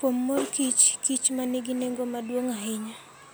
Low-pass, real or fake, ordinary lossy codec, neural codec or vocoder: none; real; none; none